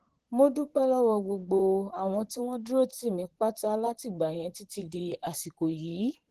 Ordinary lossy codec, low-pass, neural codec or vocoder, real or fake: Opus, 16 kbps; 14.4 kHz; vocoder, 44.1 kHz, 128 mel bands, Pupu-Vocoder; fake